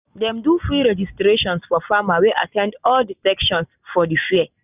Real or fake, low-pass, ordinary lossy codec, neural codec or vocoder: real; 3.6 kHz; none; none